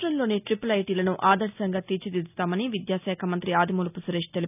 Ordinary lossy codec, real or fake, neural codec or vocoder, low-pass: none; real; none; 3.6 kHz